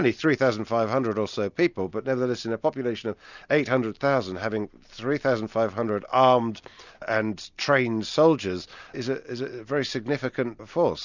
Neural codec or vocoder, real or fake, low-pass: none; real; 7.2 kHz